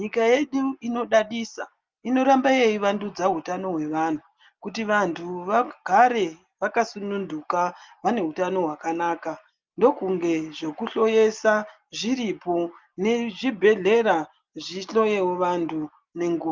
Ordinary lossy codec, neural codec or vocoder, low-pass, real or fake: Opus, 32 kbps; none; 7.2 kHz; real